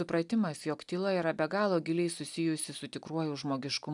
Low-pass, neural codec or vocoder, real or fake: 10.8 kHz; none; real